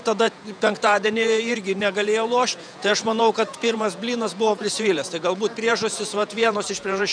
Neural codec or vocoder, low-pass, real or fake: vocoder, 48 kHz, 128 mel bands, Vocos; 9.9 kHz; fake